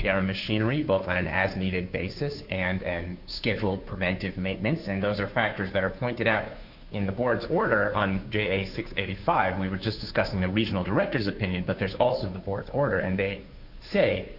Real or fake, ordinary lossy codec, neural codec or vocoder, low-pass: fake; Opus, 64 kbps; codec, 16 kHz, 2 kbps, FunCodec, trained on Chinese and English, 25 frames a second; 5.4 kHz